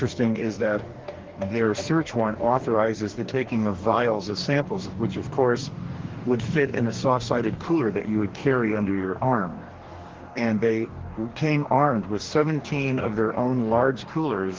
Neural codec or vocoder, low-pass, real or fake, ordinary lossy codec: codec, 44.1 kHz, 2.6 kbps, DAC; 7.2 kHz; fake; Opus, 16 kbps